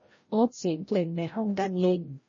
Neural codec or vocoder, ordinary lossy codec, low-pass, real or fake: codec, 16 kHz, 0.5 kbps, FreqCodec, larger model; MP3, 32 kbps; 7.2 kHz; fake